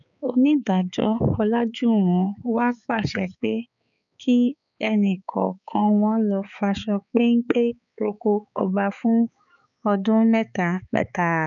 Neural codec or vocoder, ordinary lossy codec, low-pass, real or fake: codec, 16 kHz, 4 kbps, X-Codec, HuBERT features, trained on balanced general audio; none; 7.2 kHz; fake